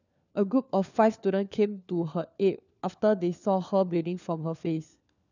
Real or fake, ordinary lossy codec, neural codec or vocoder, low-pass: fake; none; codec, 16 kHz, 4 kbps, FunCodec, trained on LibriTTS, 50 frames a second; 7.2 kHz